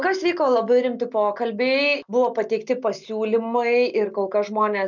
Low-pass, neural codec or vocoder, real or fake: 7.2 kHz; none; real